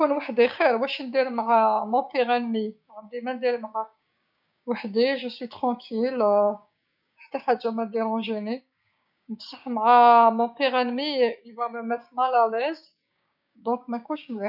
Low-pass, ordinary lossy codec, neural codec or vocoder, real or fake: 5.4 kHz; none; codec, 16 kHz, 6 kbps, DAC; fake